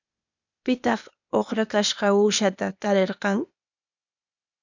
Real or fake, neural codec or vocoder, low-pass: fake; codec, 16 kHz, 0.8 kbps, ZipCodec; 7.2 kHz